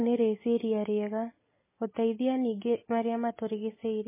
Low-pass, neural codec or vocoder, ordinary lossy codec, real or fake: 3.6 kHz; none; MP3, 16 kbps; real